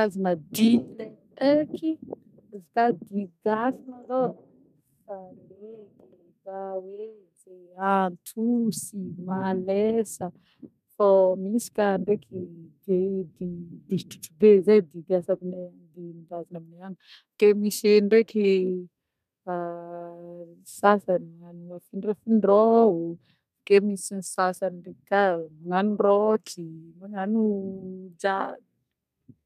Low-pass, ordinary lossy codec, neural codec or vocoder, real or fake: 14.4 kHz; none; codec, 32 kHz, 1.9 kbps, SNAC; fake